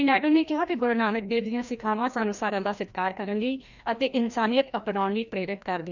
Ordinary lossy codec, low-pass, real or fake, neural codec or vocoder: none; 7.2 kHz; fake; codec, 16 kHz, 1 kbps, FreqCodec, larger model